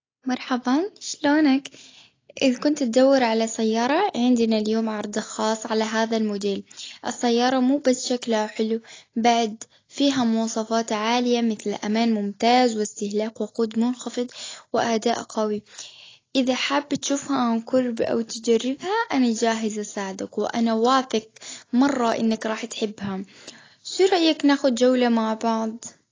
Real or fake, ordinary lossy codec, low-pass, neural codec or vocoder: real; AAC, 32 kbps; 7.2 kHz; none